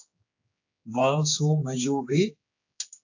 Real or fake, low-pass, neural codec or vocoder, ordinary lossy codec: fake; 7.2 kHz; codec, 16 kHz, 2 kbps, X-Codec, HuBERT features, trained on general audio; AAC, 48 kbps